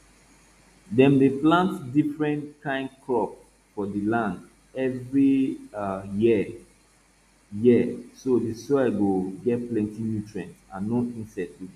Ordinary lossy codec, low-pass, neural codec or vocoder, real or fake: none; 14.4 kHz; none; real